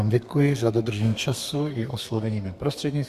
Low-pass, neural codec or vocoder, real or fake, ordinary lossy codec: 14.4 kHz; codec, 32 kHz, 1.9 kbps, SNAC; fake; Opus, 64 kbps